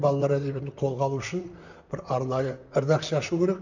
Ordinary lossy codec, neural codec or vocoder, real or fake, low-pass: none; vocoder, 44.1 kHz, 128 mel bands, Pupu-Vocoder; fake; 7.2 kHz